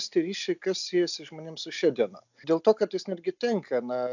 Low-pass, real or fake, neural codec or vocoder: 7.2 kHz; real; none